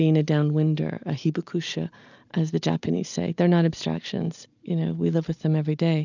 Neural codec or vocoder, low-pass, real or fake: none; 7.2 kHz; real